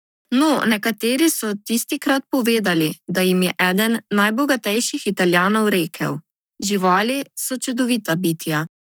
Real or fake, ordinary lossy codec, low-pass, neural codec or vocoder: fake; none; none; codec, 44.1 kHz, 7.8 kbps, Pupu-Codec